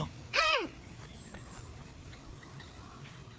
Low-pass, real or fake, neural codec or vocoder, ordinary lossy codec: none; fake; codec, 16 kHz, 4 kbps, FreqCodec, larger model; none